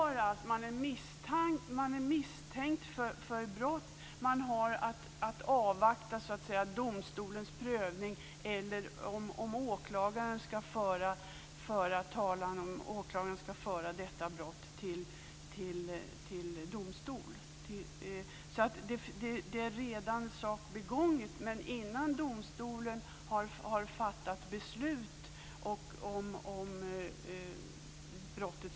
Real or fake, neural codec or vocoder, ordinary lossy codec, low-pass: real; none; none; none